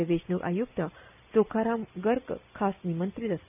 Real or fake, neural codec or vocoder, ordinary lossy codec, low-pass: real; none; none; 3.6 kHz